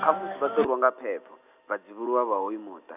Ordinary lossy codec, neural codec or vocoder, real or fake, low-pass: none; none; real; 3.6 kHz